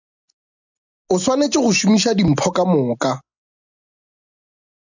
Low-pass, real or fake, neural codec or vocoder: 7.2 kHz; real; none